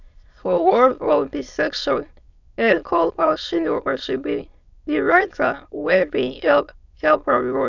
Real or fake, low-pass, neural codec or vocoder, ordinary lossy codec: fake; 7.2 kHz; autoencoder, 22.05 kHz, a latent of 192 numbers a frame, VITS, trained on many speakers; none